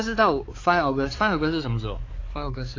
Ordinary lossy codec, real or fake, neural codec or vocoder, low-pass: AAC, 32 kbps; fake; codec, 16 kHz, 4 kbps, X-Codec, HuBERT features, trained on balanced general audio; 7.2 kHz